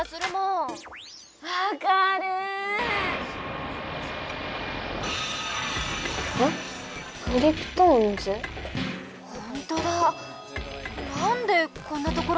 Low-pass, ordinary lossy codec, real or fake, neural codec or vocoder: none; none; real; none